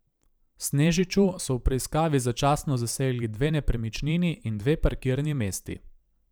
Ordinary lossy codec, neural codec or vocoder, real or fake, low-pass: none; vocoder, 44.1 kHz, 128 mel bands every 512 samples, BigVGAN v2; fake; none